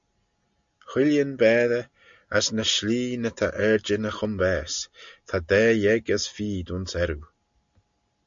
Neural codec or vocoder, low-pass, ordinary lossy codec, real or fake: none; 7.2 kHz; MP3, 64 kbps; real